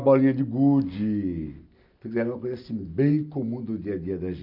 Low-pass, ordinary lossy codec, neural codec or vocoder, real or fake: 5.4 kHz; none; none; real